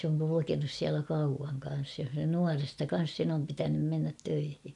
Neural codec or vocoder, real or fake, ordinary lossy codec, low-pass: none; real; none; 10.8 kHz